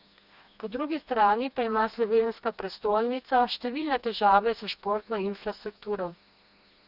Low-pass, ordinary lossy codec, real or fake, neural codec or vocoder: 5.4 kHz; none; fake; codec, 16 kHz, 2 kbps, FreqCodec, smaller model